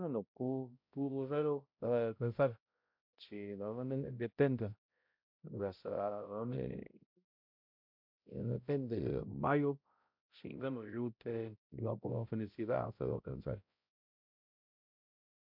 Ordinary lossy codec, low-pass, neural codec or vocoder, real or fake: MP3, 48 kbps; 5.4 kHz; codec, 16 kHz, 0.5 kbps, X-Codec, HuBERT features, trained on balanced general audio; fake